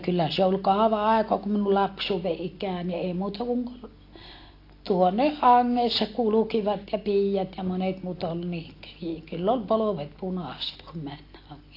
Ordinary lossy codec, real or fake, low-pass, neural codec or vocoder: AAC, 32 kbps; real; 5.4 kHz; none